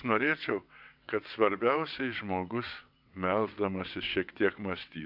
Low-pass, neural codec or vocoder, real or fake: 5.4 kHz; vocoder, 22.05 kHz, 80 mel bands, WaveNeXt; fake